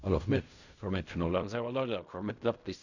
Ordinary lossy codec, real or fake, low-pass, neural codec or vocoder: none; fake; 7.2 kHz; codec, 16 kHz in and 24 kHz out, 0.4 kbps, LongCat-Audio-Codec, fine tuned four codebook decoder